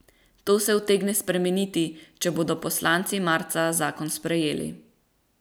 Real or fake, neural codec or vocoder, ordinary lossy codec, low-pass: real; none; none; none